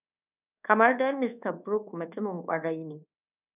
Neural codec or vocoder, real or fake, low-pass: codec, 24 kHz, 1.2 kbps, DualCodec; fake; 3.6 kHz